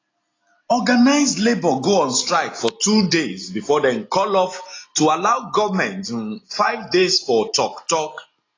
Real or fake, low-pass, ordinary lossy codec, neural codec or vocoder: real; 7.2 kHz; AAC, 32 kbps; none